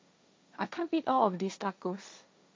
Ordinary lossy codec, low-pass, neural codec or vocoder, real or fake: none; none; codec, 16 kHz, 1.1 kbps, Voila-Tokenizer; fake